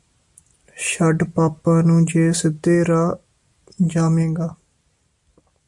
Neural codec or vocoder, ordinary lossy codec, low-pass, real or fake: none; MP3, 64 kbps; 10.8 kHz; real